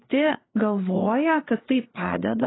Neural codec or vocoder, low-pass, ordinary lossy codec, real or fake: codec, 16 kHz, 4 kbps, FreqCodec, larger model; 7.2 kHz; AAC, 16 kbps; fake